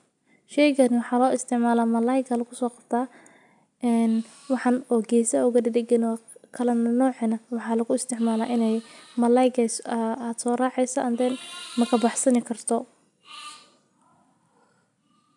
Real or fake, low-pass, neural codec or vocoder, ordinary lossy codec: real; 10.8 kHz; none; none